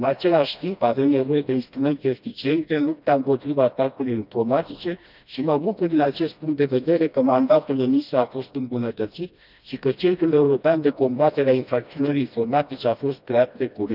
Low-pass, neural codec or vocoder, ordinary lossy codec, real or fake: 5.4 kHz; codec, 16 kHz, 1 kbps, FreqCodec, smaller model; none; fake